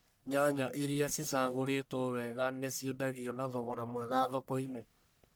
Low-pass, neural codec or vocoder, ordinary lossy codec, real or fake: none; codec, 44.1 kHz, 1.7 kbps, Pupu-Codec; none; fake